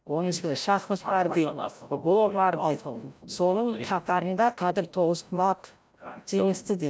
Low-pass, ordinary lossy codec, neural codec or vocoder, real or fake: none; none; codec, 16 kHz, 0.5 kbps, FreqCodec, larger model; fake